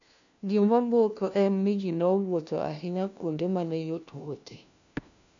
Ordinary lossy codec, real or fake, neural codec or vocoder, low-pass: none; fake; codec, 16 kHz, 1 kbps, FunCodec, trained on LibriTTS, 50 frames a second; 7.2 kHz